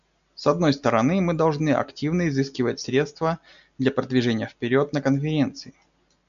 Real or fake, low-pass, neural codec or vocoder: real; 7.2 kHz; none